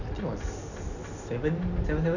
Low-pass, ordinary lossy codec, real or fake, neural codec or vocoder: 7.2 kHz; none; real; none